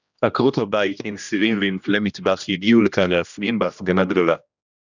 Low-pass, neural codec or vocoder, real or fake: 7.2 kHz; codec, 16 kHz, 1 kbps, X-Codec, HuBERT features, trained on general audio; fake